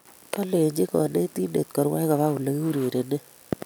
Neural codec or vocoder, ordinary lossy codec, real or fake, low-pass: none; none; real; none